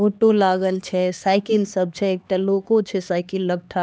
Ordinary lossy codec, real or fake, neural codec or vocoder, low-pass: none; fake; codec, 16 kHz, 2 kbps, X-Codec, HuBERT features, trained on LibriSpeech; none